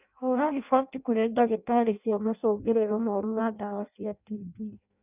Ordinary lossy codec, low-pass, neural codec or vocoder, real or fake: none; 3.6 kHz; codec, 16 kHz in and 24 kHz out, 0.6 kbps, FireRedTTS-2 codec; fake